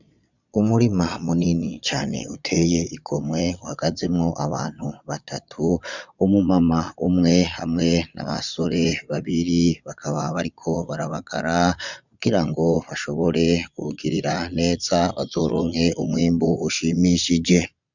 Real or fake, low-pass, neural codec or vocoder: fake; 7.2 kHz; vocoder, 22.05 kHz, 80 mel bands, Vocos